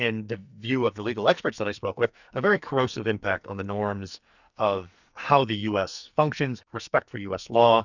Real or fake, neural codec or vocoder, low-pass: fake; codec, 44.1 kHz, 2.6 kbps, SNAC; 7.2 kHz